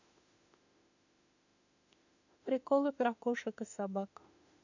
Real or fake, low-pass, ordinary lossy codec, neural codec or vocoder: fake; 7.2 kHz; none; autoencoder, 48 kHz, 32 numbers a frame, DAC-VAE, trained on Japanese speech